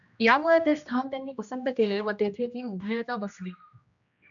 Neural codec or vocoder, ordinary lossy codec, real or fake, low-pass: codec, 16 kHz, 1 kbps, X-Codec, HuBERT features, trained on general audio; MP3, 96 kbps; fake; 7.2 kHz